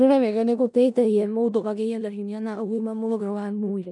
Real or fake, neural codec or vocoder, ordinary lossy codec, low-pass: fake; codec, 16 kHz in and 24 kHz out, 0.4 kbps, LongCat-Audio-Codec, four codebook decoder; none; 10.8 kHz